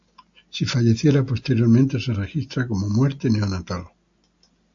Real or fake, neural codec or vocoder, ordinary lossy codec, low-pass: real; none; AAC, 64 kbps; 7.2 kHz